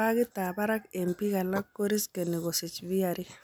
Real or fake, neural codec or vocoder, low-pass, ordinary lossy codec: real; none; none; none